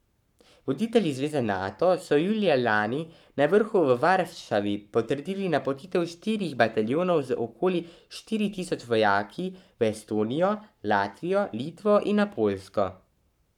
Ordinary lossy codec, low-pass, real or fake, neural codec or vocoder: none; 19.8 kHz; fake; codec, 44.1 kHz, 7.8 kbps, Pupu-Codec